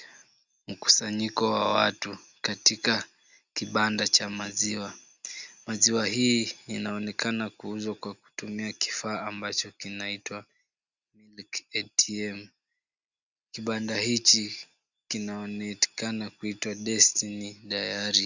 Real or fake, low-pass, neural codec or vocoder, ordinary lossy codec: real; 7.2 kHz; none; Opus, 64 kbps